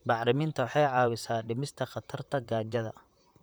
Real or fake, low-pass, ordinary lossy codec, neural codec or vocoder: fake; none; none; vocoder, 44.1 kHz, 128 mel bands, Pupu-Vocoder